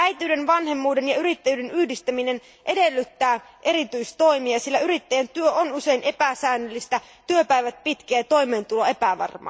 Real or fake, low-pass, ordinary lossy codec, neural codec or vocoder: real; none; none; none